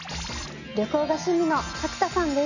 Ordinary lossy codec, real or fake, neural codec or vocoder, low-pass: none; real; none; 7.2 kHz